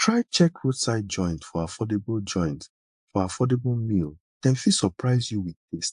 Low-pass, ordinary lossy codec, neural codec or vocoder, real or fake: 10.8 kHz; AAC, 64 kbps; vocoder, 24 kHz, 100 mel bands, Vocos; fake